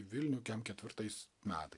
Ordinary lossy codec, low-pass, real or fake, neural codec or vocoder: AAC, 64 kbps; 10.8 kHz; real; none